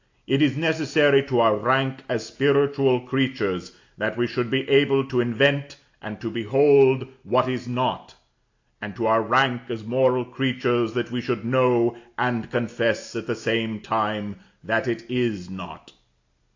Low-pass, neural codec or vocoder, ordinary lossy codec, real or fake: 7.2 kHz; none; AAC, 48 kbps; real